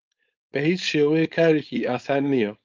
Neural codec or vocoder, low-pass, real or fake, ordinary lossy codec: codec, 16 kHz, 4.8 kbps, FACodec; 7.2 kHz; fake; Opus, 32 kbps